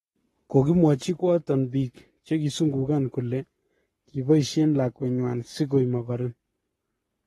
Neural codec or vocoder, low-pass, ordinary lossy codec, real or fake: codec, 44.1 kHz, 7.8 kbps, Pupu-Codec; 19.8 kHz; AAC, 32 kbps; fake